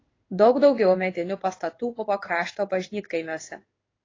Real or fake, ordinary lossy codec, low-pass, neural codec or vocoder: fake; AAC, 32 kbps; 7.2 kHz; codec, 16 kHz in and 24 kHz out, 1 kbps, XY-Tokenizer